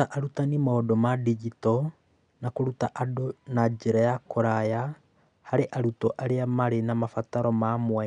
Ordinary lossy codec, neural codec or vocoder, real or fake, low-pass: none; none; real; 9.9 kHz